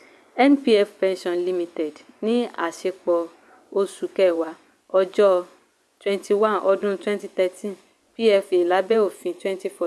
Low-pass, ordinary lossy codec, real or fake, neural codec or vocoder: none; none; fake; vocoder, 24 kHz, 100 mel bands, Vocos